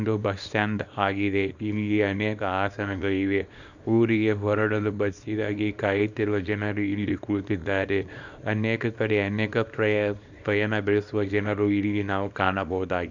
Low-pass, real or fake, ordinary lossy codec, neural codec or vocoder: 7.2 kHz; fake; none; codec, 24 kHz, 0.9 kbps, WavTokenizer, small release